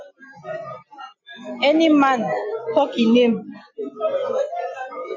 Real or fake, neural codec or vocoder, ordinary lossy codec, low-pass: real; none; AAC, 48 kbps; 7.2 kHz